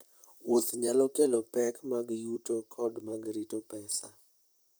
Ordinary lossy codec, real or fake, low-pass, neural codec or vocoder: none; fake; none; vocoder, 44.1 kHz, 128 mel bands, Pupu-Vocoder